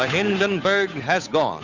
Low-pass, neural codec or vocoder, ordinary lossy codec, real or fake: 7.2 kHz; codec, 16 kHz, 8 kbps, FunCodec, trained on Chinese and English, 25 frames a second; Opus, 64 kbps; fake